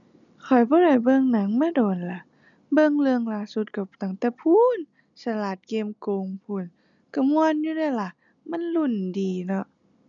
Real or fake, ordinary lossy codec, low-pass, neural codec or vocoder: real; none; 7.2 kHz; none